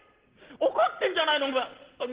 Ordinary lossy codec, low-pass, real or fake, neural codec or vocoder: Opus, 16 kbps; 3.6 kHz; real; none